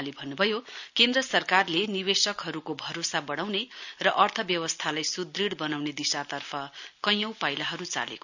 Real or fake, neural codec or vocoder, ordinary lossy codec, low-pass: real; none; none; 7.2 kHz